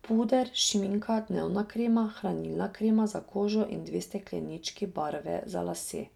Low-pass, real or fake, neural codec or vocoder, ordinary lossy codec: 19.8 kHz; real; none; none